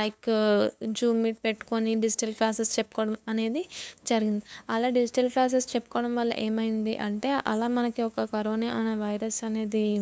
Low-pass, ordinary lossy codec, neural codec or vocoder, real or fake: none; none; codec, 16 kHz, 2 kbps, FunCodec, trained on LibriTTS, 25 frames a second; fake